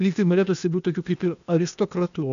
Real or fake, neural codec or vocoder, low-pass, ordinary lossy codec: fake; codec, 16 kHz, 0.8 kbps, ZipCodec; 7.2 kHz; MP3, 96 kbps